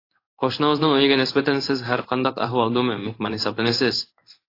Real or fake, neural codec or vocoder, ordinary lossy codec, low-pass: fake; codec, 16 kHz in and 24 kHz out, 1 kbps, XY-Tokenizer; AAC, 32 kbps; 5.4 kHz